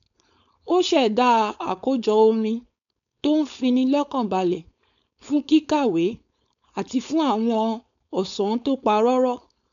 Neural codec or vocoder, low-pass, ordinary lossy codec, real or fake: codec, 16 kHz, 4.8 kbps, FACodec; 7.2 kHz; none; fake